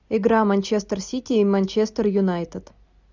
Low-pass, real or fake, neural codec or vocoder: 7.2 kHz; real; none